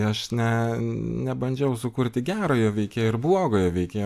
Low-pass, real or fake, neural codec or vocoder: 14.4 kHz; real; none